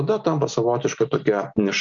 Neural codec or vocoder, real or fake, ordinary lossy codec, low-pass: none; real; MP3, 64 kbps; 7.2 kHz